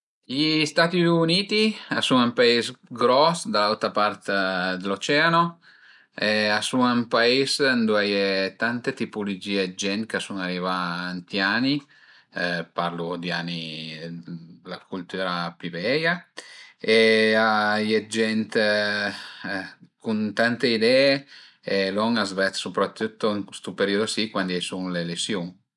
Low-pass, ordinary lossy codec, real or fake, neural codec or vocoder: 10.8 kHz; none; real; none